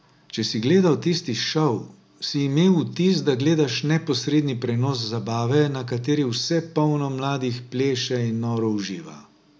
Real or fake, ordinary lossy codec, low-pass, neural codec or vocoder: real; none; none; none